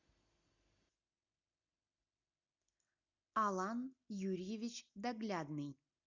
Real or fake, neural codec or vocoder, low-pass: real; none; 7.2 kHz